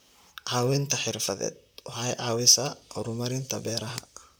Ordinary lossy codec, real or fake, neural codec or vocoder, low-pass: none; fake; vocoder, 44.1 kHz, 128 mel bands, Pupu-Vocoder; none